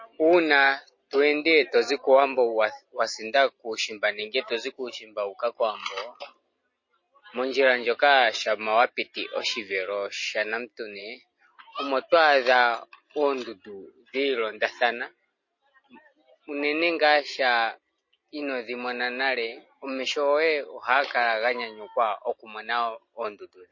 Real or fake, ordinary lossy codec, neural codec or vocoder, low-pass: real; MP3, 32 kbps; none; 7.2 kHz